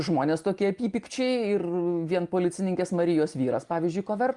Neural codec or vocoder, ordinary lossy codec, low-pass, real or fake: none; Opus, 32 kbps; 10.8 kHz; real